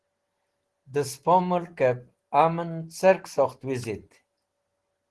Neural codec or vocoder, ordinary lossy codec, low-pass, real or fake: none; Opus, 16 kbps; 10.8 kHz; real